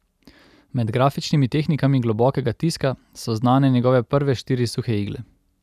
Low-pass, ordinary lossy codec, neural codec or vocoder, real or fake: 14.4 kHz; none; none; real